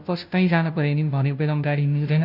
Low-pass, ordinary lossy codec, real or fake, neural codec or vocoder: 5.4 kHz; none; fake; codec, 16 kHz, 0.5 kbps, FunCodec, trained on Chinese and English, 25 frames a second